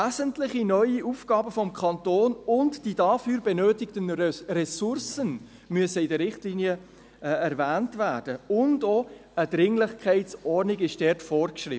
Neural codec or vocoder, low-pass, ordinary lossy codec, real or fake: none; none; none; real